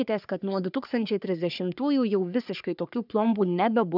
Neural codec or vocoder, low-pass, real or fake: codec, 44.1 kHz, 3.4 kbps, Pupu-Codec; 5.4 kHz; fake